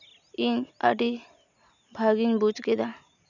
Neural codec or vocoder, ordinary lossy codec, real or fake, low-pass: none; none; real; 7.2 kHz